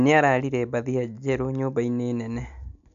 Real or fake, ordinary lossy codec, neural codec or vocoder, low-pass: real; none; none; 7.2 kHz